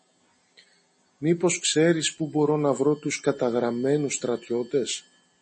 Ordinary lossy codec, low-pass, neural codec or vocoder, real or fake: MP3, 32 kbps; 10.8 kHz; none; real